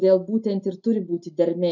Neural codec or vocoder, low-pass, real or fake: none; 7.2 kHz; real